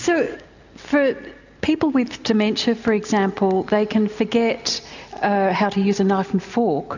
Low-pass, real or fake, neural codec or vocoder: 7.2 kHz; real; none